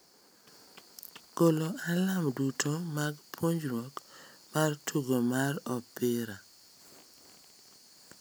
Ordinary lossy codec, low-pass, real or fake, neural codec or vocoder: none; none; real; none